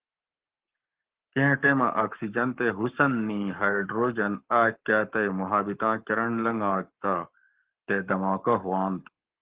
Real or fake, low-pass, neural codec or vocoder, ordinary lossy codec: fake; 3.6 kHz; codec, 44.1 kHz, 7.8 kbps, Pupu-Codec; Opus, 16 kbps